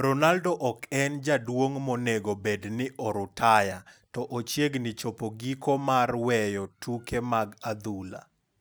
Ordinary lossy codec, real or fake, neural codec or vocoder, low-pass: none; real; none; none